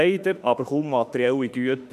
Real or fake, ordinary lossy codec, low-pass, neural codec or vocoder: fake; none; 14.4 kHz; autoencoder, 48 kHz, 32 numbers a frame, DAC-VAE, trained on Japanese speech